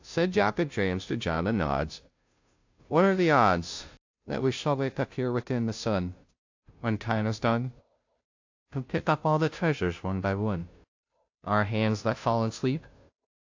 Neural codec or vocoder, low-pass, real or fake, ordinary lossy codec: codec, 16 kHz, 0.5 kbps, FunCodec, trained on Chinese and English, 25 frames a second; 7.2 kHz; fake; AAC, 48 kbps